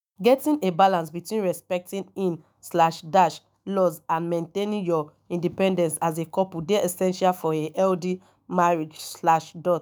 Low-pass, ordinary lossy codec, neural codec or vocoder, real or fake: none; none; autoencoder, 48 kHz, 128 numbers a frame, DAC-VAE, trained on Japanese speech; fake